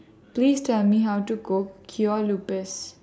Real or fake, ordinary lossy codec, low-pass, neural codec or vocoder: real; none; none; none